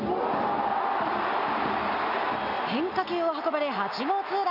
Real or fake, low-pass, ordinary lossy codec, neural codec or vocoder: real; 5.4 kHz; AAC, 48 kbps; none